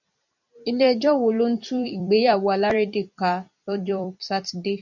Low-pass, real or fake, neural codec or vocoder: 7.2 kHz; fake; vocoder, 44.1 kHz, 128 mel bands every 256 samples, BigVGAN v2